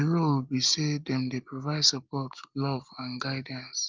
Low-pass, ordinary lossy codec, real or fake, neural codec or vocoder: 7.2 kHz; Opus, 24 kbps; real; none